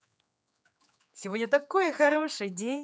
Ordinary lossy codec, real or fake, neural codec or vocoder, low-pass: none; fake; codec, 16 kHz, 4 kbps, X-Codec, HuBERT features, trained on general audio; none